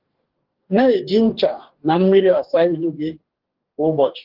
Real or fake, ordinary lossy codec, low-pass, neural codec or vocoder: fake; Opus, 16 kbps; 5.4 kHz; codec, 44.1 kHz, 2.6 kbps, DAC